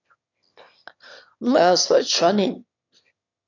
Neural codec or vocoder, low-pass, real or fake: autoencoder, 22.05 kHz, a latent of 192 numbers a frame, VITS, trained on one speaker; 7.2 kHz; fake